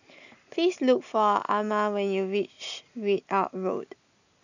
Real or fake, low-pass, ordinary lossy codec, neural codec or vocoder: real; 7.2 kHz; none; none